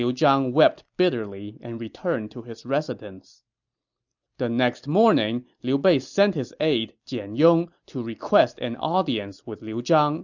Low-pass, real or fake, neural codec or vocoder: 7.2 kHz; real; none